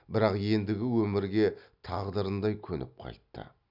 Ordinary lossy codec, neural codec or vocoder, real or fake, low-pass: none; none; real; 5.4 kHz